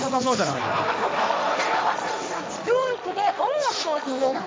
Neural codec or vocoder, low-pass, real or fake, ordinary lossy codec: codec, 16 kHz, 1.1 kbps, Voila-Tokenizer; none; fake; none